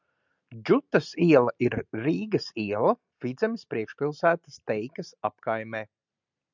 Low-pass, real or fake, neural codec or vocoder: 7.2 kHz; real; none